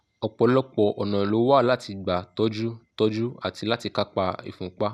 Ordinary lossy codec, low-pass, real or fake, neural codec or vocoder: none; none; real; none